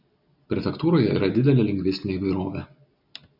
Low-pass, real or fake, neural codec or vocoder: 5.4 kHz; real; none